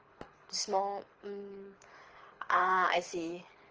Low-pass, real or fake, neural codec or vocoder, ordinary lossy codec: 7.2 kHz; fake; codec, 24 kHz, 6 kbps, HILCodec; Opus, 24 kbps